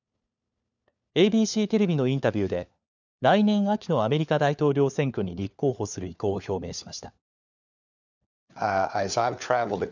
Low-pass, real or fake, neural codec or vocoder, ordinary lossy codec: 7.2 kHz; fake; codec, 16 kHz, 4 kbps, FunCodec, trained on LibriTTS, 50 frames a second; none